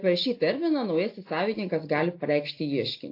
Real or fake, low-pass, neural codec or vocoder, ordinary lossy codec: real; 5.4 kHz; none; AAC, 32 kbps